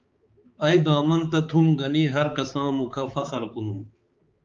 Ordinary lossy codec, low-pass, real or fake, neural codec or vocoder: Opus, 24 kbps; 7.2 kHz; fake; codec, 16 kHz, 4 kbps, X-Codec, HuBERT features, trained on balanced general audio